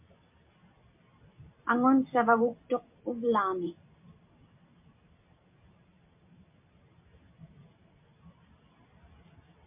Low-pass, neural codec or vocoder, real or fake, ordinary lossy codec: 3.6 kHz; none; real; MP3, 32 kbps